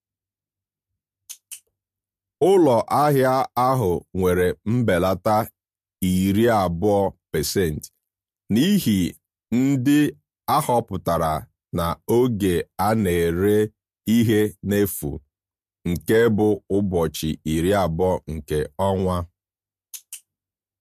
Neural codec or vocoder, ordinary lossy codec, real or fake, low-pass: none; MP3, 64 kbps; real; 14.4 kHz